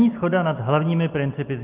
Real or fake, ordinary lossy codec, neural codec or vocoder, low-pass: real; Opus, 32 kbps; none; 3.6 kHz